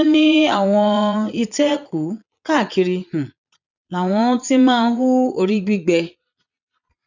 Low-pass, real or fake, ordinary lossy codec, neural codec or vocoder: 7.2 kHz; fake; none; vocoder, 22.05 kHz, 80 mel bands, Vocos